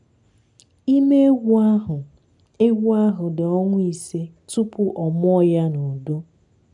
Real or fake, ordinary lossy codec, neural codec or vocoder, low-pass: real; none; none; 10.8 kHz